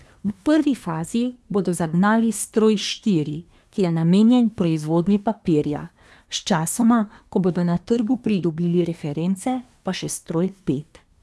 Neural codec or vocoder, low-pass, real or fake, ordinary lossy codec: codec, 24 kHz, 1 kbps, SNAC; none; fake; none